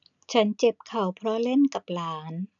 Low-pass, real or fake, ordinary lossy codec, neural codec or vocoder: 7.2 kHz; real; none; none